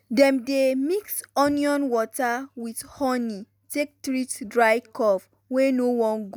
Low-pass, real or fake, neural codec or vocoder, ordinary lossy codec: none; real; none; none